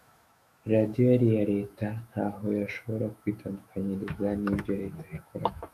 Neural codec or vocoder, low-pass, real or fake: autoencoder, 48 kHz, 128 numbers a frame, DAC-VAE, trained on Japanese speech; 14.4 kHz; fake